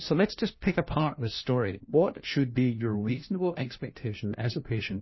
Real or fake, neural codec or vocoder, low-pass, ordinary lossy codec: fake; codec, 16 kHz, 1 kbps, FunCodec, trained on LibriTTS, 50 frames a second; 7.2 kHz; MP3, 24 kbps